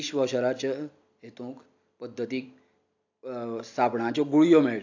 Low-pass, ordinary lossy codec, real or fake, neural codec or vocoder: 7.2 kHz; AAC, 48 kbps; real; none